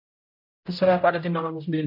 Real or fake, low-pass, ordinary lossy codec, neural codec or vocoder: fake; 5.4 kHz; MP3, 32 kbps; codec, 16 kHz, 0.5 kbps, X-Codec, HuBERT features, trained on general audio